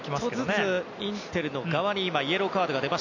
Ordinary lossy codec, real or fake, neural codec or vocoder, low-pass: none; real; none; 7.2 kHz